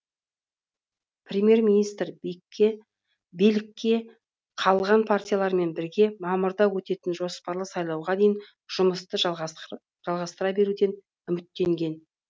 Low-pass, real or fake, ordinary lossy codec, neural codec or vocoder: none; real; none; none